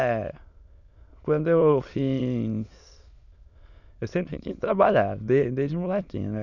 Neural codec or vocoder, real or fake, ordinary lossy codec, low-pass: autoencoder, 22.05 kHz, a latent of 192 numbers a frame, VITS, trained on many speakers; fake; none; 7.2 kHz